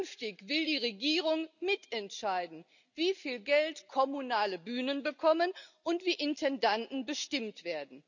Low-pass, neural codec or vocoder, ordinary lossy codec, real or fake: 7.2 kHz; none; none; real